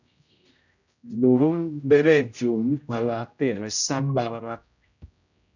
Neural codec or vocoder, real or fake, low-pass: codec, 16 kHz, 0.5 kbps, X-Codec, HuBERT features, trained on general audio; fake; 7.2 kHz